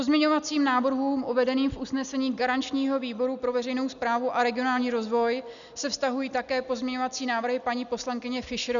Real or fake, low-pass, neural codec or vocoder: real; 7.2 kHz; none